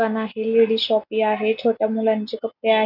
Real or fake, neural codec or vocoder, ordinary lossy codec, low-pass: real; none; none; 5.4 kHz